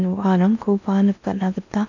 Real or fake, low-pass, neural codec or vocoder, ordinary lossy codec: fake; 7.2 kHz; codec, 16 kHz in and 24 kHz out, 0.8 kbps, FocalCodec, streaming, 65536 codes; none